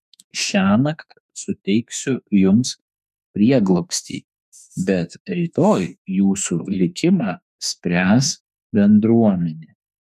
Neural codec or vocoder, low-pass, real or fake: autoencoder, 48 kHz, 32 numbers a frame, DAC-VAE, trained on Japanese speech; 14.4 kHz; fake